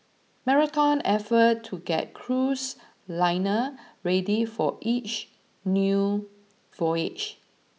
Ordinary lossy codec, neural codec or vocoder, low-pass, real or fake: none; none; none; real